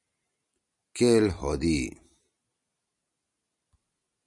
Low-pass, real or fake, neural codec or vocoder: 10.8 kHz; real; none